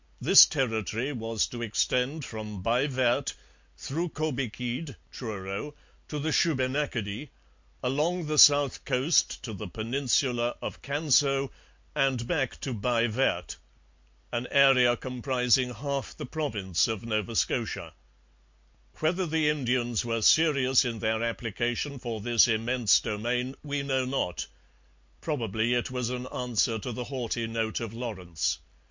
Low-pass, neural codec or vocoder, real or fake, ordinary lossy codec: 7.2 kHz; none; real; MP3, 48 kbps